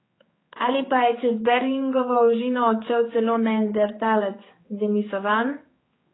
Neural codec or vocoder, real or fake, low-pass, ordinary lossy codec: codec, 16 kHz, 4 kbps, X-Codec, HuBERT features, trained on general audio; fake; 7.2 kHz; AAC, 16 kbps